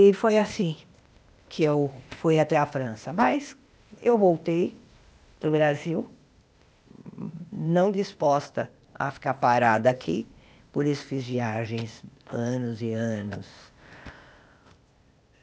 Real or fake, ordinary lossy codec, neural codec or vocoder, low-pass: fake; none; codec, 16 kHz, 0.8 kbps, ZipCodec; none